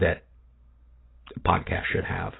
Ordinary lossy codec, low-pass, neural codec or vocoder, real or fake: AAC, 16 kbps; 7.2 kHz; none; real